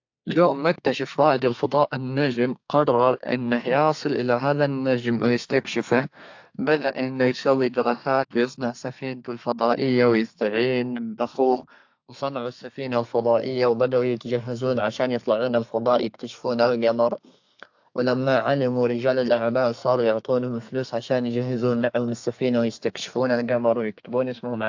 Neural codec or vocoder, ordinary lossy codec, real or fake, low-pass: codec, 32 kHz, 1.9 kbps, SNAC; AAC, 48 kbps; fake; 7.2 kHz